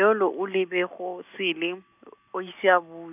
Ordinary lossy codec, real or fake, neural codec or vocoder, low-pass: none; real; none; 3.6 kHz